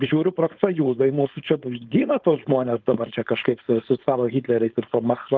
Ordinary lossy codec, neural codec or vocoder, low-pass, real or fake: Opus, 32 kbps; codec, 16 kHz, 4.8 kbps, FACodec; 7.2 kHz; fake